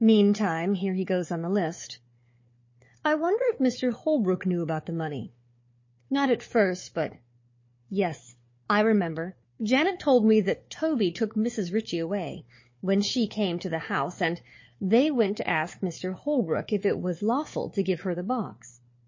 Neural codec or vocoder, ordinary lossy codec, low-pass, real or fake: codec, 16 kHz, 4 kbps, FunCodec, trained on Chinese and English, 50 frames a second; MP3, 32 kbps; 7.2 kHz; fake